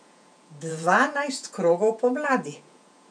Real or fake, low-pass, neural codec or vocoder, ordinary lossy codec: fake; 9.9 kHz; vocoder, 48 kHz, 128 mel bands, Vocos; none